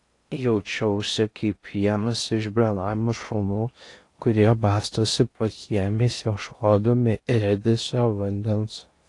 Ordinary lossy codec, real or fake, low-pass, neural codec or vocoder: AAC, 48 kbps; fake; 10.8 kHz; codec, 16 kHz in and 24 kHz out, 0.6 kbps, FocalCodec, streaming, 4096 codes